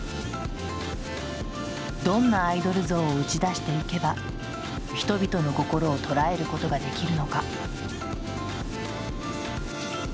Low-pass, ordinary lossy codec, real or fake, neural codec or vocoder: none; none; real; none